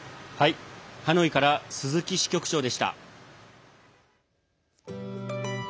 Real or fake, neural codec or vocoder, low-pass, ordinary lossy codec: real; none; none; none